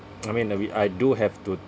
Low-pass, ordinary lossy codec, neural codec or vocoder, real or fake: none; none; none; real